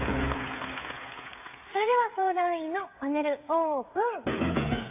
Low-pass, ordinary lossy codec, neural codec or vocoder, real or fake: 3.6 kHz; AAC, 24 kbps; codec, 16 kHz, 8 kbps, FreqCodec, smaller model; fake